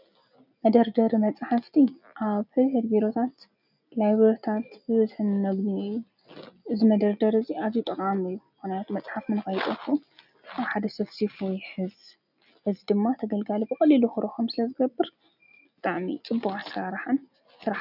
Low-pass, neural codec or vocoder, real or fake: 5.4 kHz; none; real